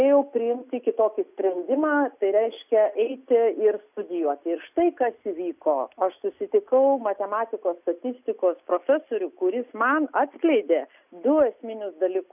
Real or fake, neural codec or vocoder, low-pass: real; none; 3.6 kHz